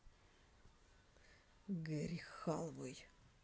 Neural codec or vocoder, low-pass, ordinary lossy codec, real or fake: none; none; none; real